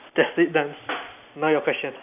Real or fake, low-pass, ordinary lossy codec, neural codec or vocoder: real; 3.6 kHz; none; none